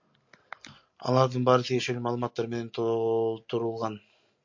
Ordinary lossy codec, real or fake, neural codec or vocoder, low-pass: MP3, 48 kbps; real; none; 7.2 kHz